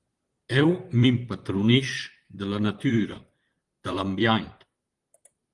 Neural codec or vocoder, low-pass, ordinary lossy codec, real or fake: vocoder, 44.1 kHz, 128 mel bands, Pupu-Vocoder; 10.8 kHz; Opus, 24 kbps; fake